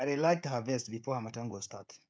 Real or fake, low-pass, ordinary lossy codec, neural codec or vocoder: fake; none; none; codec, 16 kHz, 16 kbps, FreqCodec, smaller model